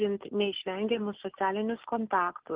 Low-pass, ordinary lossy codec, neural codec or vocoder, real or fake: 3.6 kHz; Opus, 16 kbps; none; real